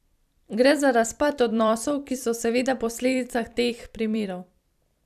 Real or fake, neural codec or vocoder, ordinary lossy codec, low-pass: fake; vocoder, 44.1 kHz, 128 mel bands every 256 samples, BigVGAN v2; none; 14.4 kHz